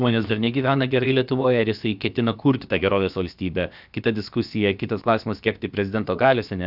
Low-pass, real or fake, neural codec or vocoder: 5.4 kHz; fake; codec, 16 kHz, about 1 kbps, DyCAST, with the encoder's durations